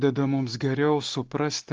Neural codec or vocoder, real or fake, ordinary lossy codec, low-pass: none; real; Opus, 16 kbps; 7.2 kHz